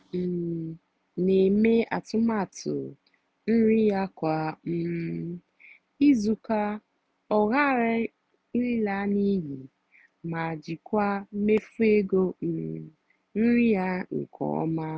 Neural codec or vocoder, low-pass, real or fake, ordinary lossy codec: none; none; real; none